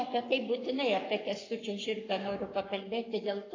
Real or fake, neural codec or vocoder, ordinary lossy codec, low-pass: fake; codec, 44.1 kHz, 7.8 kbps, Pupu-Codec; AAC, 32 kbps; 7.2 kHz